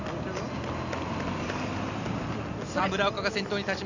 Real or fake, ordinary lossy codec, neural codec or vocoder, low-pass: real; none; none; 7.2 kHz